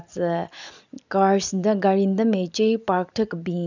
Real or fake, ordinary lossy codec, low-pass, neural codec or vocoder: real; none; 7.2 kHz; none